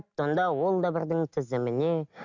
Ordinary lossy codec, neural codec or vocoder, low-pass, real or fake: none; none; 7.2 kHz; real